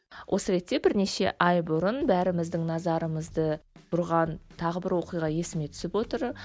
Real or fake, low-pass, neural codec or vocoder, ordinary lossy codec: real; none; none; none